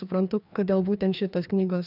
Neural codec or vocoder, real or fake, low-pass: codec, 16 kHz, 2 kbps, FreqCodec, larger model; fake; 5.4 kHz